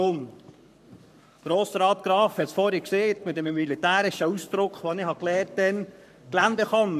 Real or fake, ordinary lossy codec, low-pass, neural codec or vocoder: fake; none; 14.4 kHz; codec, 44.1 kHz, 7.8 kbps, Pupu-Codec